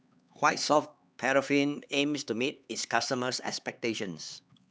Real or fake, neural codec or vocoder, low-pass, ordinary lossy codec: fake; codec, 16 kHz, 4 kbps, X-Codec, HuBERT features, trained on LibriSpeech; none; none